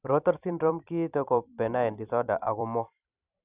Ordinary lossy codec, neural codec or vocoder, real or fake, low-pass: none; vocoder, 44.1 kHz, 128 mel bands every 256 samples, BigVGAN v2; fake; 3.6 kHz